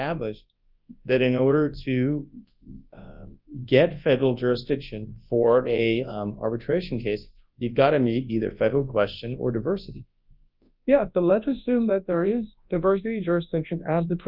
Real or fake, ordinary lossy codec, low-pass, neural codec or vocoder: fake; Opus, 24 kbps; 5.4 kHz; codec, 24 kHz, 0.9 kbps, WavTokenizer, large speech release